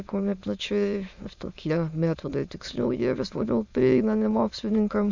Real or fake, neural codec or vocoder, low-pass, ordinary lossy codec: fake; autoencoder, 22.05 kHz, a latent of 192 numbers a frame, VITS, trained on many speakers; 7.2 kHz; Opus, 64 kbps